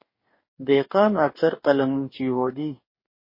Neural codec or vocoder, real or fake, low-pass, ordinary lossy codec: codec, 44.1 kHz, 2.6 kbps, DAC; fake; 5.4 kHz; MP3, 24 kbps